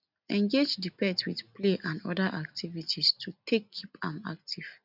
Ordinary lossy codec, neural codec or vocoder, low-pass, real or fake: none; none; 5.4 kHz; real